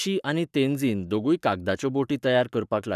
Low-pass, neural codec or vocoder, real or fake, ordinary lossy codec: 14.4 kHz; codec, 44.1 kHz, 7.8 kbps, Pupu-Codec; fake; none